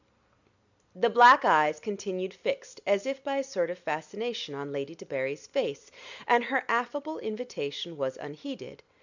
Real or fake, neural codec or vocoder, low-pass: real; none; 7.2 kHz